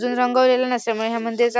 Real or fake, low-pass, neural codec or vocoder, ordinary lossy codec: real; none; none; none